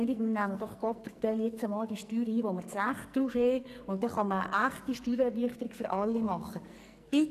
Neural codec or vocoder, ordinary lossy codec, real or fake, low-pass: codec, 44.1 kHz, 2.6 kbps, SNAC; none; fake; 14.4 kHz